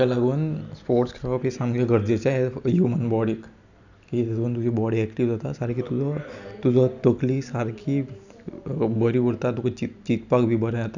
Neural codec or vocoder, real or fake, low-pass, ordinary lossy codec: none; real; 7.2 kHz; none